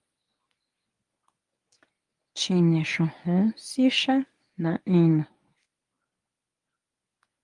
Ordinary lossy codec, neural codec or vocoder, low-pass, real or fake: Opus, 32 kbps; codec, 24 kHz, 0.9 kbps, WavTokenizer, medium speech release version 1; 10.8 kHz; fake